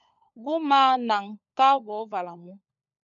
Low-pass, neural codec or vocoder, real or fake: 7.2 kHz; codec, 16 kHz, 16 kbps, FunCodec, trained on LibriTTS, 50 frames a second; fake